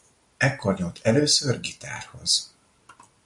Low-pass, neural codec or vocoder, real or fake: 10.8 kHz; none; real